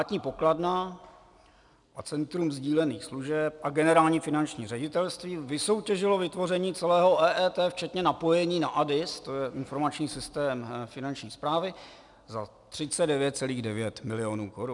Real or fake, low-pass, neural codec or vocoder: real; 10.8 kHz; none